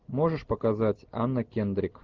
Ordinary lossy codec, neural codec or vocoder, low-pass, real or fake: Opus, 32 kbps; none; 7.2 kHz; real